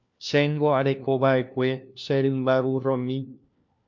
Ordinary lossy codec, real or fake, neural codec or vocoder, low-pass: MP3, 64 kbps; fake; codec, 16 kHz, 1 kbps, FunCodec, trained on LibriTTS, 50 frames a second; 7.2 kHz